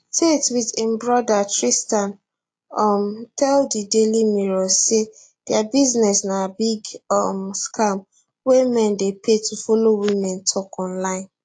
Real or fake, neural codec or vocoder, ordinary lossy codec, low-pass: real; none; AAC, 48 kbps; 9.9 kHz